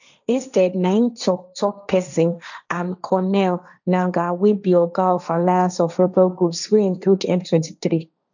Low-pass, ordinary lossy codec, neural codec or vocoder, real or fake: 7.2 kHz; none; codec, 16 kHz, 1.1 kbps, Voila-Tokenizer; fake